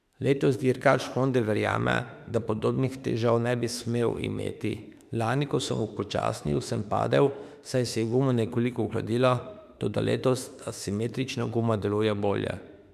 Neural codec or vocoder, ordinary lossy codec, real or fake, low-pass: autoencoder, 48 kHz, 32 numbers a frame, DAC-VAE, trained on Japanese speech; none; fake; 14.4 kHz